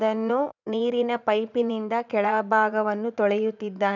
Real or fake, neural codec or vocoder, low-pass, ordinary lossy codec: fake; vocoder, 44.1 kHz, 80 mel bands, Vocos; 7.2 kHz; none